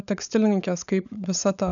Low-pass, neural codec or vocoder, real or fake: 7.2 kHz; codec, 16 kHz, 16 kbps, FunCodec, trained on LibriTTS, 50 frames a second; fake